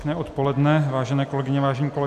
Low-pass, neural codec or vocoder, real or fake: 14.4 kHz; none; real